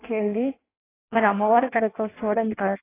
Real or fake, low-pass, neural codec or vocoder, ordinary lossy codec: fake; 3.6 kHz; codec, 16 kHz in and 24 kHz out, 0.6 kbps, FireRedTTS-2 codec; AAC, 16 kbps